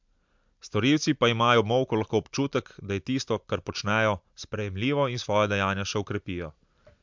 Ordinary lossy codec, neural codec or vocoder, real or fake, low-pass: MP3, 64 kbps; none; real; 7.2 kHz